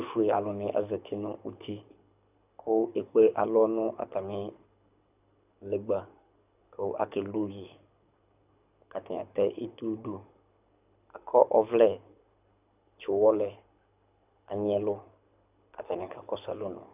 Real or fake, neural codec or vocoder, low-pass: fake; codec, 24 kHz, 6 kbps, HILCodec; 3.6 kHz